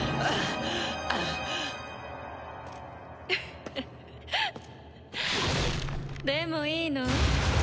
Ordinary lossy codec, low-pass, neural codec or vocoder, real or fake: none; none; none; real